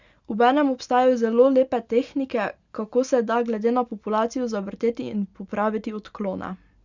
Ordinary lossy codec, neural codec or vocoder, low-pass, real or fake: Opus, 64 kbps; none; 7.2 kHz; real